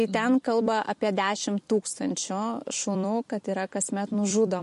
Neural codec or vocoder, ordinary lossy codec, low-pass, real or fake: vocoder, 44.1 kHz, 128 mel bands every 256 samples, BigVGAN v2; MP3, 48 kbps; 14.4 kHz; fake